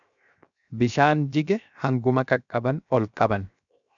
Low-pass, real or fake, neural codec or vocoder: 7.2 kHz; fake; codec, 16 kHz, 0.7 kbps, FocalCodec